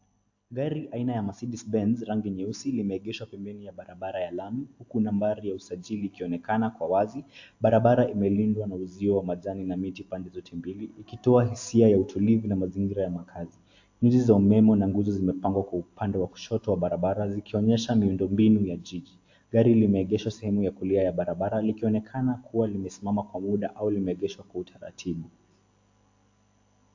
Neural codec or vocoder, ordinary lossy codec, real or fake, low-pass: none; AAC, 48 kbps; real; 7.2 kHz